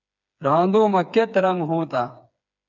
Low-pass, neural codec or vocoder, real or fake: 7.2 kHz; codec, 16 kHz, 4 kbps, FreqCodec, smaller model; fake